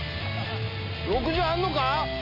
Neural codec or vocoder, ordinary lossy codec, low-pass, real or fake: none; none; 5.4 kHz; real